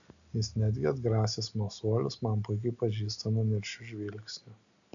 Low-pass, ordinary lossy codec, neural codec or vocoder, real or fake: 7.2 kHz; MP3, 96 kbps; none; real